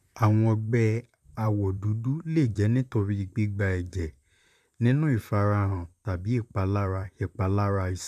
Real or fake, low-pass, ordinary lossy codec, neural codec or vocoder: fake; 14.4 kHz; none; vocoder, 44.1 kHz, 128 mel bands, Pupu-Vocoder